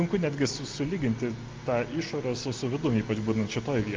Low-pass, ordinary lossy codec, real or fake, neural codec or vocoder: 7.2 kHz; Opus, 24 kbps; real; none